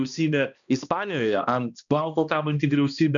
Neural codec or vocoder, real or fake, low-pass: codec, 16 kHz, 1 kbps, X-Codec, HuBERT features, trained on balanced general audio; fake; 7.2 kHz